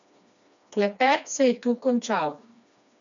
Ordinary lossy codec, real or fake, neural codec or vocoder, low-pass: none; fake; codec, 16 kHz, 2 kbps, FreqCodec, smaller model; 7.2 kHz